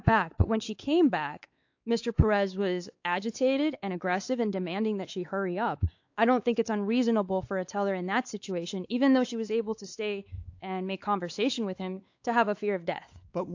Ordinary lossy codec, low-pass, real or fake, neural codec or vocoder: AAC, 48 kbps; 7.2 kHz; fake; codec, 16 kHz, 4 kbps, X-Codec, WavLM features, trained on Multilingual LibriSpeech